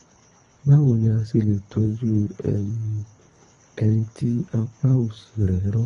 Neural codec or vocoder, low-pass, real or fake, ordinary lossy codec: codec, 24 kHz, 3 kbps, HILCodec; 10.8 kHz; fake; AAC, 32 kbps